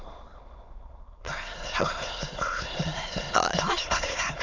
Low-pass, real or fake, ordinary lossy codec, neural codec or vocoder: 7.2 kHz; fake; none; autoencoder, 22.05 kHz, a latent of 192 numbers a frame, VITS, trained on many speakers